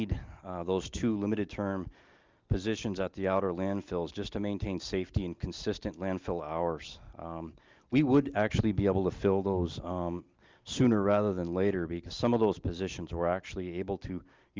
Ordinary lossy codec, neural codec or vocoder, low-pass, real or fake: Opus, 24 kbps; none; 7.2 kHz; real